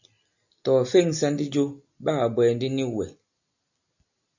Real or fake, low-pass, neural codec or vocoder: real; 7.2 kHz; none